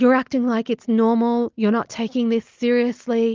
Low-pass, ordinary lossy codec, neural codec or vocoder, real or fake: 7.2 kHz; Opus, 32 kbps; none; real